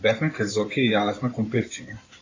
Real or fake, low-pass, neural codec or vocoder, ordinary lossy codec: fake; 7.2 kHz; codec, 16 kHz in and 24 kHz out, 2.2 kbps, FireRedTTS-2 codec; AAC, 32 kbps